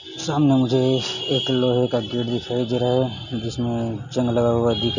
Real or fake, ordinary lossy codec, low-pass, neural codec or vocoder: real; none; 7.2 kHz; none